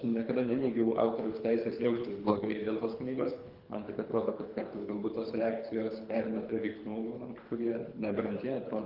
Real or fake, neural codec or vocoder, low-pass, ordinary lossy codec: fake; codec, 24 kHz, 3 kbps, HILCodec; 5.4 kHz; Opus, 32 kbps